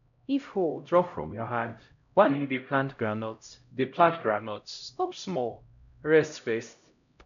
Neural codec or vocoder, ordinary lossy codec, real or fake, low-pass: codec, 16 kHz, 0.5 kbps, X-Codec, HuBERT features, trained on LibriSpeech; none; fake; 7.2 kHz